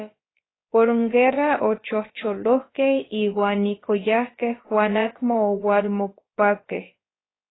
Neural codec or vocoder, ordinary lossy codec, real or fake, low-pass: codec, 16 kHz, about 1 kbps, DyCAST, with the encoder's durations; AAC, 16 kbps; fake; 7.2 kHz